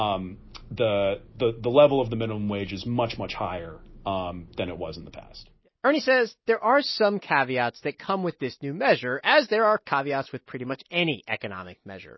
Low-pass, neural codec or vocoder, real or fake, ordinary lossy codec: 7.2 kHz; none; real; MP3, 24 kbps